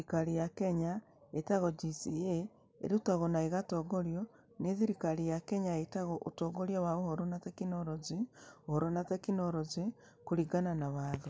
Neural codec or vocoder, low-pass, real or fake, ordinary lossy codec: none; none; real; none